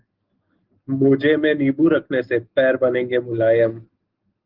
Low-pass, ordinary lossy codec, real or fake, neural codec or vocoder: 5.4 kHz; Opus, 16 kbps; real; none